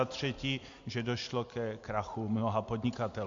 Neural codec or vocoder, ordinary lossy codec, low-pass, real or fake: none; MP3, 48 kbps; 7.2 kHz; real